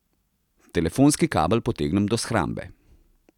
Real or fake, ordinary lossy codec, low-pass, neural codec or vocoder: real; none; 19.8 kHz; none